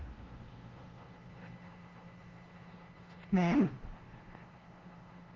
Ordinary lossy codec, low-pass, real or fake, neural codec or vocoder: Opus, 16 kbps; 7.2 kHz; fake; codec, 16 kHz, 0.5 kbps, FunCodec, trained on LibriTTS, 25 frames a second